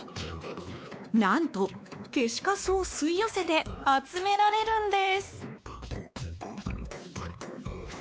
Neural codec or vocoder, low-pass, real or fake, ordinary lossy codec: codec, 16 kHz, 2 kbps, X-Codec, WavLM features, trained on Multilingual LibriSpeech; none; fake; none